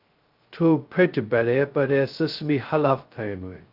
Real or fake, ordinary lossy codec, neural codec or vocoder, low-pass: fake; Opus, 24 kbps; codec, 16 kHz, 0.2 kbps, FocalCodec; 5.4 kHz